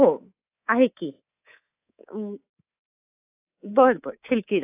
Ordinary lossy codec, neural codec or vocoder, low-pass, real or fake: AAC, 24 kbps; codec, 24 kHz, 3.1 kbps, DualCodec; 3.6 kHz; fake